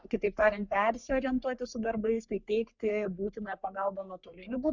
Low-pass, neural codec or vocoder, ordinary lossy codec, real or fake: 7.2 kHz; codec, 44.1 kHz, 3.4 kbps, Pupu-Codec; Opus, 64 kbps; fake